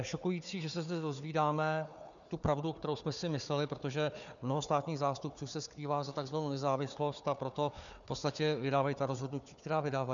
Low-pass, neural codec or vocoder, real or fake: 7.2 kHz; codec, 16 kHz, 4 kbps, FunCodec, trained on Chinese and English, 50 frames a second; fake